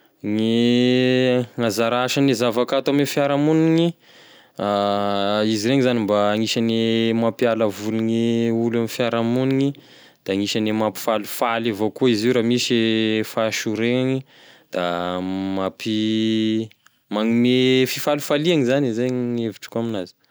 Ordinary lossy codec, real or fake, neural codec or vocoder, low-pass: none; real; none; none